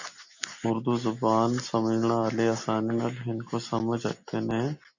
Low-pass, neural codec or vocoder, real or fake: 7.2 kHz; none; real